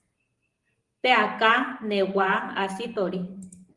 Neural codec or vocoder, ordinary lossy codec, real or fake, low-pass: vocoder, 44.1 kHz, 128 mel bands every 512 samples, BigVGAN v2; Opus, 24 kbps; fake; 10.8 kHz